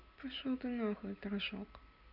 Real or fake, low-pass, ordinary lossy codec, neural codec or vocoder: real; 5.4 kHz; none; none